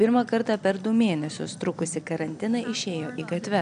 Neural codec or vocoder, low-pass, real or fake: none; 9.9 kHz; real